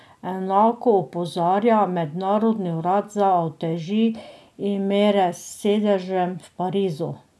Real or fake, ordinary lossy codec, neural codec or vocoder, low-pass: real; none; none; none